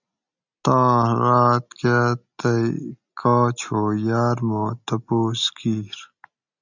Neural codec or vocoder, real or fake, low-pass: none; real; 7.2 kHz